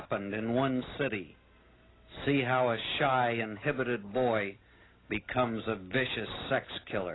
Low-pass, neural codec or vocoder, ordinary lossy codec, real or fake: 7.2 kHz; none; AAC, 16 kbps; real